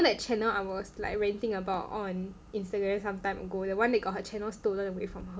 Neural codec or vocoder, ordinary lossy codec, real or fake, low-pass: none; none; real; none